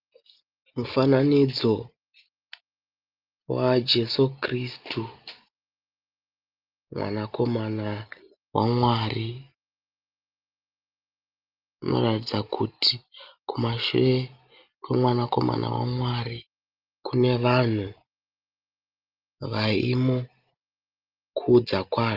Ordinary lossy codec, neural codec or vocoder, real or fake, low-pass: Opus, 32 kbps; none; real; 5.4 kHz